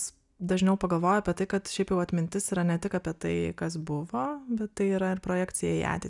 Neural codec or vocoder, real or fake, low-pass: none; real; 10.8 kHz